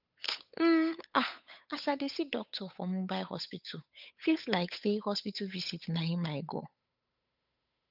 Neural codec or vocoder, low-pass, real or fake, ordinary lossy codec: codec, 16 kHz, 8 kbps, FunCodec, trained on Chinese and English, 25 frames a second; 5.4 kHz; fake; none